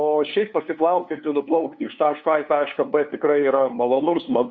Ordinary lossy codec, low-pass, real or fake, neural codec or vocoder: Opus, 64 kbps; 7.2 kHz; fake; codec, 16 kHz, 2 kbps, FunCodec, trained on LibriTTS, 25 frames a second